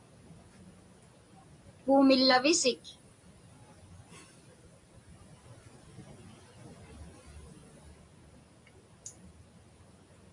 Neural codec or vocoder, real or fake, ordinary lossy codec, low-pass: none; real; AAC, 64 kbps; 10.8 kHz